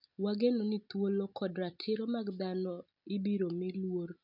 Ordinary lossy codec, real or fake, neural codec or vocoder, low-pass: none; real; none; 5.4 kHz